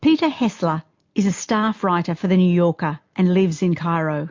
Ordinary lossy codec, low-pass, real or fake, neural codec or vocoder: MP3, 48 kbps; 7.2 kHz; real; none